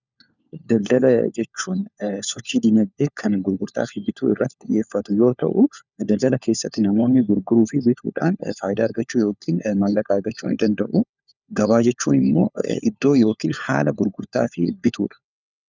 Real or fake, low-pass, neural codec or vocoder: fake; 7.2 kHz; codec, 16 kHz, 4 kbps, FunCodec, trained on LibriTTS, 50 frames a second